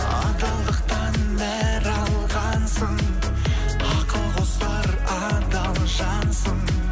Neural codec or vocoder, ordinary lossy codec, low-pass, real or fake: none; none; none; real